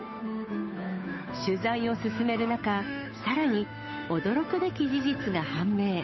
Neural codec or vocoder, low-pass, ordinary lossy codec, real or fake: codec, 16 kHz, 8 kbps, FunCodec, trained on Chinese and English, 25 frames a second; 7.2 kHz; MP3, 24 kbps; fake